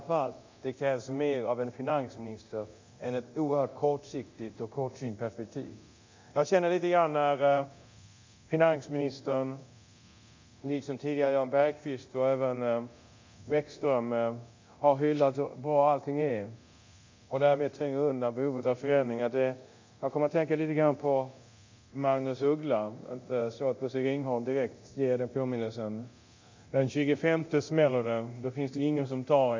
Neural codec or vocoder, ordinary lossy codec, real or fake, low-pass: codec, 24 kHz, 0.9 kbps, DualCodec; MP3, 64 kbps; fake; 7.2 kHz